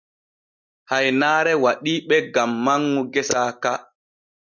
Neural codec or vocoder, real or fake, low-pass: none; real; 7.2 kHz